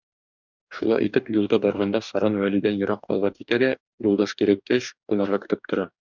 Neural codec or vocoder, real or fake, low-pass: codec, 44.1 kHz, 2.6 kbps, DAC; fake; 7.2 kHz